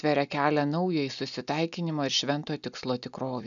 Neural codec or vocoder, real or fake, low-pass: none; real; 7.2 kHz